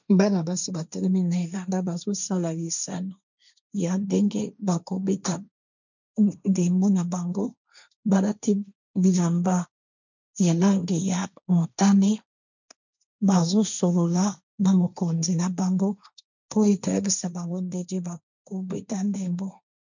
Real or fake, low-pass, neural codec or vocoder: fake; 7.2 kHz; codec, 16 kHz, 1.1 kbps, Voila-Tokenizer